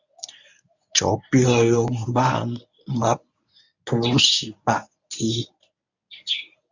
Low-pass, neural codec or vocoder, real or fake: 7.2 kHz; codec, 24 kHz, 0.9 kbps, WavTokenizer, medium speech release version 1; fake